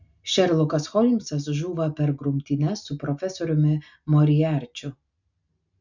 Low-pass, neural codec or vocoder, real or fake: 7.2 kHz; none; real